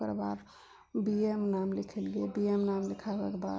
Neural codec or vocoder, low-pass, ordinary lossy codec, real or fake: none; none; none; real